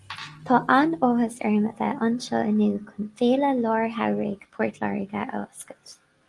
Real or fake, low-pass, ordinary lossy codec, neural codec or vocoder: real; 10.8 kHz; Opus, 24 kbps; none